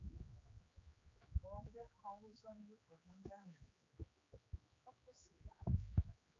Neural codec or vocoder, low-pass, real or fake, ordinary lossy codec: codec, 16 kHz, 4 kbps, X-Codec, HuBERT features, trained on general audio; 7.2 kHz; fake; AAC, 32 kbps